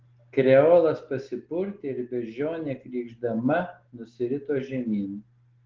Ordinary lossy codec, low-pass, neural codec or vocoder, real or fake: Opus, 16 kbps; 7.2 kHz; none; real